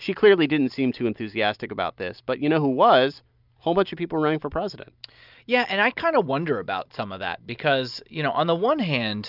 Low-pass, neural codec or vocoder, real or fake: 5.4 kHz; none; real